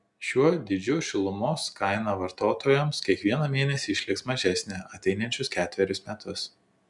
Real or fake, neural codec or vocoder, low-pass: real; none; 10.8 kHz